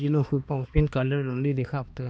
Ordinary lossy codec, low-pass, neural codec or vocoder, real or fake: none; none; codec, 16 kHz, 2 kbps, X-Codec, HuBERT features, trained on balanced general audio; fake